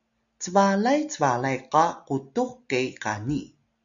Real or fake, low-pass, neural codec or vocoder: real; 7.2 kHz; none